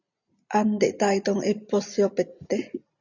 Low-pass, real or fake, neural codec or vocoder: 7.2 kHz; real; none